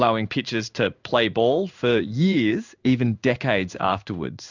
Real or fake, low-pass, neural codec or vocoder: fake; 7.2 kHz; codec, 16 kHz in and 24 kHz out, 1 kbps, XY-Tokenizer